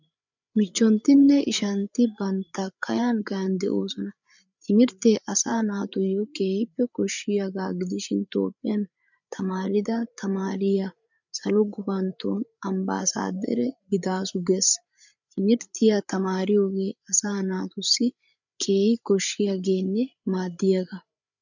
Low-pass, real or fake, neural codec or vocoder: 7.2 kHz; fake; codec, 16 kHz, 8 kbps, FreqCodec, larger model